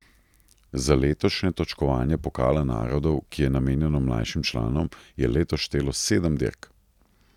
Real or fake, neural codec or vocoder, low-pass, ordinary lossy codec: real; none; 19.8 kHz; none